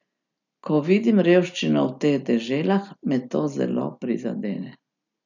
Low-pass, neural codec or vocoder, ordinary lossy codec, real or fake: 7.2 kHz; none; none; real